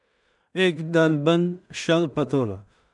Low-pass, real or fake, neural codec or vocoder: 10.8 kHz; fake; codec, 16 kHz in and 24 kHz out, 0.4 kbps, LongCat-Audio-Codec, two codebook decoder